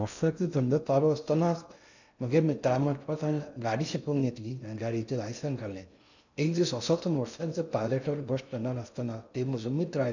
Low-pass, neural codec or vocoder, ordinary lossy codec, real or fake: 7.2 kHz; codec, 16 kHz in and 24 kHz out, 0.6 kbps, FocalCodec, streaming, 2048 codes; none; fake